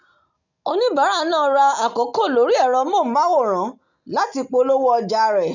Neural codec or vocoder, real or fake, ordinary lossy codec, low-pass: none; real; none; 7.2 kHz